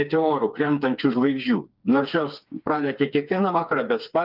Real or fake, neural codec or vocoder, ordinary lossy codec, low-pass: fake; codec, 16 kHz, 4 kbps, FreqCodec, smaller model; Opus, 24 kbps; 5.4 kHz